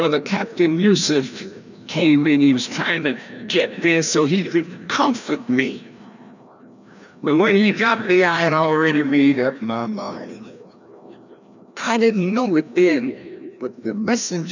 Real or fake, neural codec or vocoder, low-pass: fake; codec, 16 kHz, 1 kbps, FreqCodec, larger model; 7.2 kHz